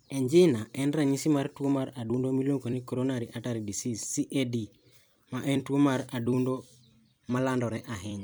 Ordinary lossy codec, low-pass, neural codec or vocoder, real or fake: none; none; vocoder, 44.1 kHz, 128 mel bands every 256 samples, BigVGAN v2; fake